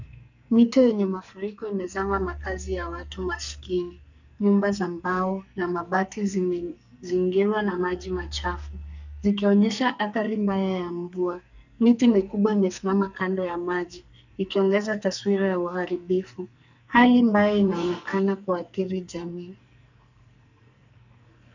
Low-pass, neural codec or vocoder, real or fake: 7.2 kHz; codec, 44.1 kHz, 2.6 kbps, SNAC; fake